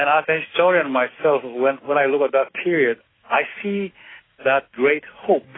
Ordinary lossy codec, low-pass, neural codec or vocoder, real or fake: AAC, 16 kbps; 7.2 kHz; codec, 16 kHz, 6 kbps, DAC; fake